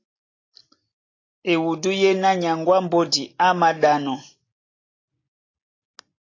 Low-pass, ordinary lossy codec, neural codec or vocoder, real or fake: 7.2 kHz; AAC, 32 kbps; none; real